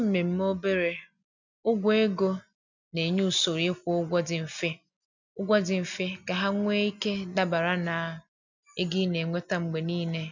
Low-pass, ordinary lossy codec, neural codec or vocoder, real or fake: 7.2 kHz; none; none; real